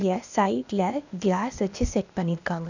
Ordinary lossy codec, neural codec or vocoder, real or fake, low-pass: none; codec, 16 kHz, 0.8 kbps, ZipCodec; fake; 7.2 kHz